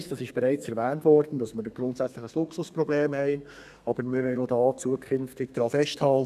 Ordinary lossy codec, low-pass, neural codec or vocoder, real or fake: none; 14.4 kHz; codec, 32 kHz, 1.9 kbps, SNAC; fake